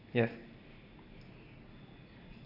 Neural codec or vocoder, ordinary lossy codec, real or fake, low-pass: none; none; real; 5.4 kHz